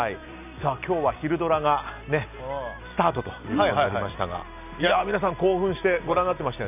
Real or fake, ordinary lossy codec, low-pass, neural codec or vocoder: real; none; 3.6 kHz; none